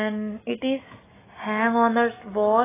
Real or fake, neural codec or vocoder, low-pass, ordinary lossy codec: real; none; 3.6 kHz; AAC, 16 kbps